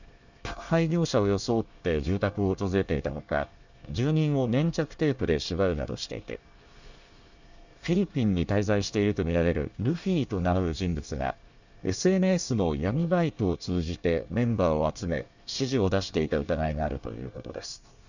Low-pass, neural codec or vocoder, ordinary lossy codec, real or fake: 7.2 kHz; codec, 24 kHz, 1 kbps, SNAC; none; fake